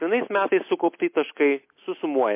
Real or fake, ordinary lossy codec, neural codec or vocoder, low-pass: real; MP3, 24 kbps; none; 3.6 kHz